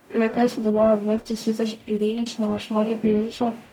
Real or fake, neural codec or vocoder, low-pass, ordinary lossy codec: fake; codec, 44.1 kHz, 0.9 kbps, DAC; 19.8 kHz; none